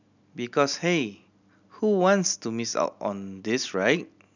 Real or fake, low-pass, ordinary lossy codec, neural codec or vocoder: real; 7.2 kHz; none; none